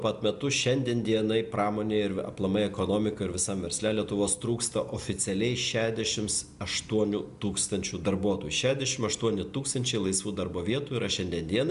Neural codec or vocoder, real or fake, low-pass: none; real; 10.8 kHz